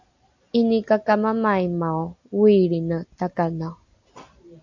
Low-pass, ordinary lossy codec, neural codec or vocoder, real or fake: 7.2 kHz; AAC, 48 kbps; none; real